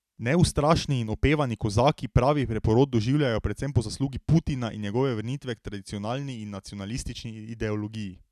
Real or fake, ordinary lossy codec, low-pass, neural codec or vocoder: real; none; 14.4 kHz; none